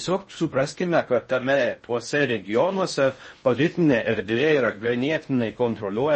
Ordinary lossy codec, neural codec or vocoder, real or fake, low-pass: MP3, 32 kbps; codec, 16 kHz in and 24 kHz out, 0.6 kbps, FocalCodec, streaming, 4096 codes; fake; 10.8 kHz